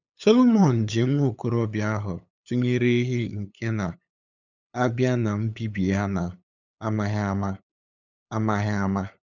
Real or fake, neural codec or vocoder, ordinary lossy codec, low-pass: fake; codec, 16 kHz, 8 kbps, FunCodec, trained on LibriTTS, 25 frames a second; none; 7.2 kHz